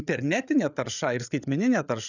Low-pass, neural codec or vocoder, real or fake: 7.2 kHz; codec, 16 kHz, 8 kbps, FreqCodec, larger model; fake